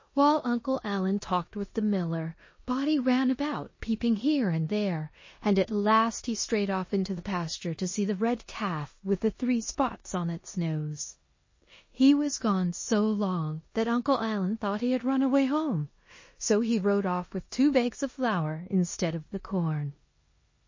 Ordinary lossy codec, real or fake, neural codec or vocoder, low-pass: MP3, 32 kbps; fake; codec, 16 kHz in and 24 kHz out, 0.9 kbps, LongCat-Audio-Codec, four codebook decoder; 7.2 kHz